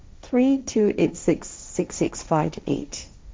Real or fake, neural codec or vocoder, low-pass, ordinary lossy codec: fake; codec, 16 kHz, 1.1 kbps, Voila-Tokenizer; none; none